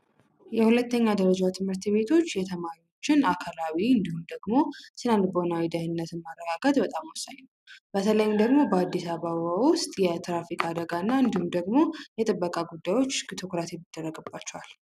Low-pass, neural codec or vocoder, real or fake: 14.4 kHz; none; real